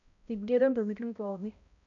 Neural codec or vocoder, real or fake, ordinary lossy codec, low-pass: codec, 16 kHz, 0.5 kbps, X-Codec, HuBERT features, trained on balanced general audio; fake; none; 7.2 kHz